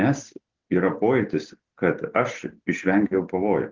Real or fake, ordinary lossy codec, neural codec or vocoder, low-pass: real; Opus, 16 kbps; none; 7.2 kHz